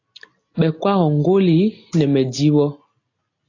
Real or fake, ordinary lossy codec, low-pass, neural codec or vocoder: real; AAC, 32 kbps; 7.2 kHz; none